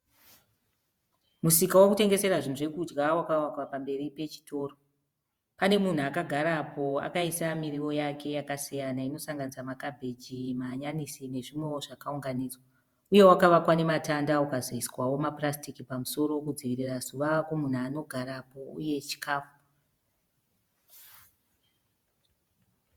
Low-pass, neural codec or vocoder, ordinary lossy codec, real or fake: 19.8 kHz; vocoder, 48 kHz, 128 mel bands, Vocos; Opus, 64 kbps; fake